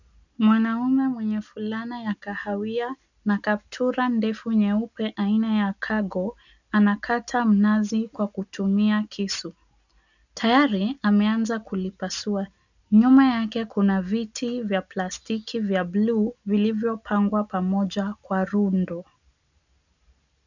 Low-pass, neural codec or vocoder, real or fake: 7.2 kHz; none; real